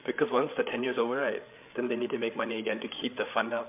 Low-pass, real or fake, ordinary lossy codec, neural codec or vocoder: 3.6 kHz; fake; MP3, 32 kbps; codec, 16 kHz, 8 kbps, FreqCodec, larger model